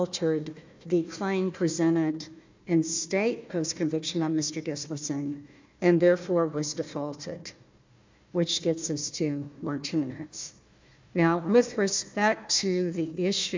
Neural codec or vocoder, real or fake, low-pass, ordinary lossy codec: codec, 16 kHz, 1 kbps, FunCodec, trained on Chinese and English, 50 frames a second; fake; 7.2 kHz; MP3, 64 kbps